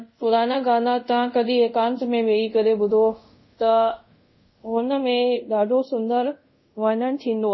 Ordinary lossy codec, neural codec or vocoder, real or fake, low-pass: MP3, 24 kbps; codec, 24 kHz, 0.5 kbps, DualCodec; fake; 7.2 kHz